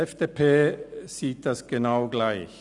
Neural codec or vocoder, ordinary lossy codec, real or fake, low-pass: none; none; real; 10.8 kHz